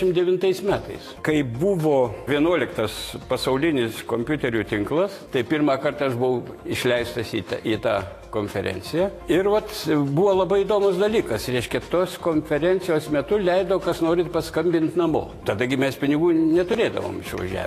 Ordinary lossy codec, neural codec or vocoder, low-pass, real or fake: AAC, 48 kbps; autoencoder, 48 kHz, 128 numbers a frame, DAC-VAE, trained on Japanese speech; 14.4 kHz; fake